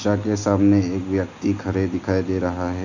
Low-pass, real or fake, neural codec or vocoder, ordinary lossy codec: 7.2 kHz; real; none; none